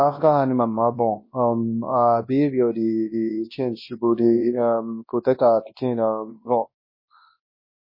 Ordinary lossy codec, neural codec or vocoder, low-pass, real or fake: MP3, 24 kbps; codec, 24 kHz, 0.9 kbps, WavTokenizer, large speech release; 5.4 kHz; fake